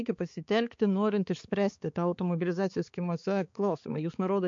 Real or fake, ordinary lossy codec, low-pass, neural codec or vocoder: fake; MP3, 48 kbps; 7.2 kHz; codec, 16 kHz, 4 kbps, X-Codec, HuBERT features, trained on balanced general audio